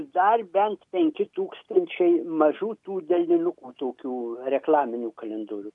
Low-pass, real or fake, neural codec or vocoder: 10.8 kHz; real; none